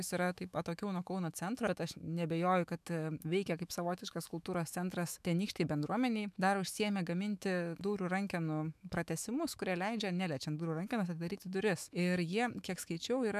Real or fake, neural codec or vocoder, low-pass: fake; autoencoder, 48 kHz, 128 numbers a frame, DAC-VAE, trained on Japanese speech; 14.4 kHz